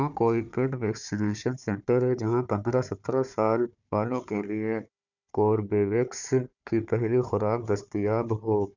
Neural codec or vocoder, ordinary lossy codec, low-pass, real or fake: codec, 16 kHz, 4 kbps, FunCodec, trained on Chinese and English, 50 frames a second; none; 7.2 kHz; fake